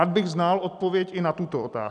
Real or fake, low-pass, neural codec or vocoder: real; 10.8 kHz; none